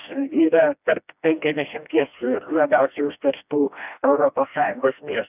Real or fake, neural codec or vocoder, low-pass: fake; codec, 16 kHz, 1 kbps, FreqCodec, smaller model; 3.6 kHz